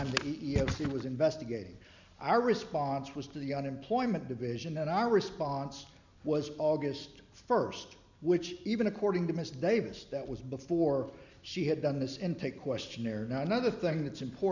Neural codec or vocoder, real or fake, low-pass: none; real; 7.2 kHz